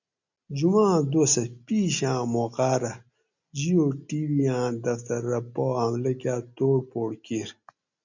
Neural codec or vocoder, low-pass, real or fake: none; 7.2 kHz; real